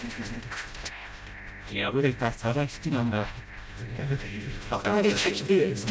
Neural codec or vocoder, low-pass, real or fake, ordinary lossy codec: codec, 16 kHz, 0.5 kbps, FreqCodec, smaller model; none; fake; none